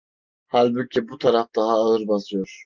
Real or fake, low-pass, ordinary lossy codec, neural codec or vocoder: real; 7.2 kHz; Opus, 32 kbps; none